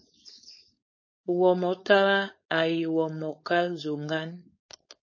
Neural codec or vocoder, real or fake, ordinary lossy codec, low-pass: codec, 16 kHz, 4.8 kbps, FACodec; fake; MP3, 32 kbps; 7.2 kHz